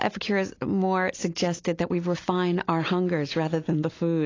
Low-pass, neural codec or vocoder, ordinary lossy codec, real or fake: 7.2 kHz; none; AAC, 32 kbps; real